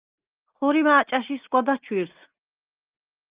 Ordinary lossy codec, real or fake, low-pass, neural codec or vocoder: Opus, 32 kbps; real; 3.6 kHz; none